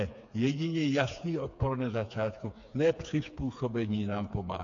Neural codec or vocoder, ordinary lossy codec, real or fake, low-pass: codec, 16 kHz, 4 kbps, FreqCodec, smaller model; AAC, 48 kbps; fake; 7.2 kHz